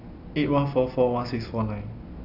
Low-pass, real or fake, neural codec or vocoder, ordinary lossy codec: 5.4 kHz; real; none; none